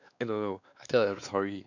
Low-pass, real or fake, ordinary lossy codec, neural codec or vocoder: 7.2 kHz; fake; none; codec, 16 kHz, 2 kbps, X-Codec, WavLM features, trained on Multilingual LibriSpeech